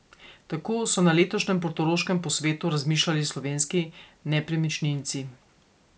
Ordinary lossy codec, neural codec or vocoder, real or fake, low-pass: none; none; real; none